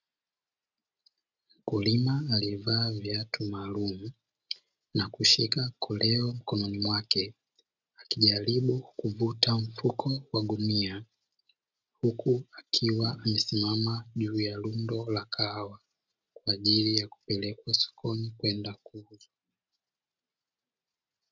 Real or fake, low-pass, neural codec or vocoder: real; 7.2 kHz; none